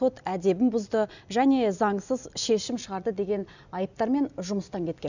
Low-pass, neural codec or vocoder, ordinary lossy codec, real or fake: 7.2 kHz; none; none; real